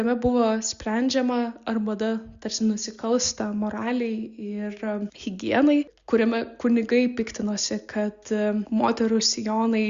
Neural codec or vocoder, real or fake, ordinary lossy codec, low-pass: none; real; Opus, 64 kbps; 7.2 kHz